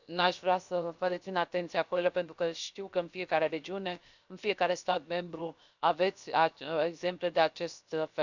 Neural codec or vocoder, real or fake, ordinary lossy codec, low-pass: codec, 16 kHz, 0.7 kbps, FocalCodec; fake; none; 7.2 kHz